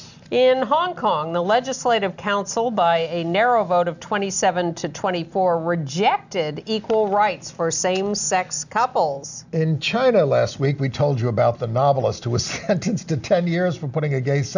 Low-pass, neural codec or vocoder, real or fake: 7.2 kHz; none; real